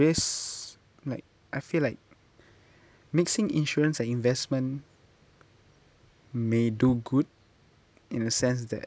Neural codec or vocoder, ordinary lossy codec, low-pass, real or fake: none; none; none; real